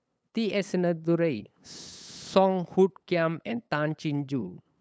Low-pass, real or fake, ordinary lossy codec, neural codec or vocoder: none; fake; none; codec, 16 kHz, 8 kbps, FunCodec, trained on LibriTTS, 25 frames a second